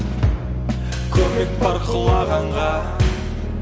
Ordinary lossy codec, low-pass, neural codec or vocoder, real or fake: none; none; none; real